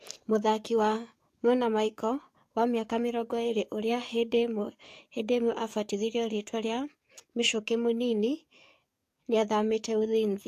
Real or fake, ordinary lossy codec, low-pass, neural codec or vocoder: fake; AAC, 64 kbps; 14.4 kHz; codec, 44.1 kHz, 7.8 kbps, DAC